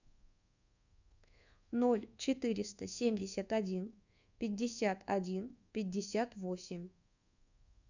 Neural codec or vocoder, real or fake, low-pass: codec, 24 kHz, 1.2 kbps, DualCodec; fake; 7.2 kHz